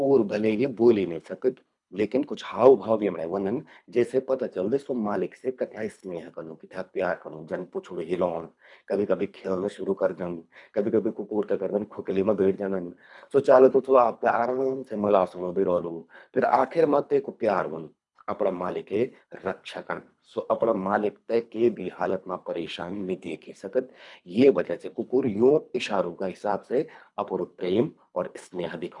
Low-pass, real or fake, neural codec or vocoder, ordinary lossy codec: 10.8 kHz; fake; codec, 24 kHz, 3 kbps, HILCodec; none